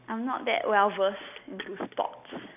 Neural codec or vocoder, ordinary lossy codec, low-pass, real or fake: none; none; 3.6 kHz; real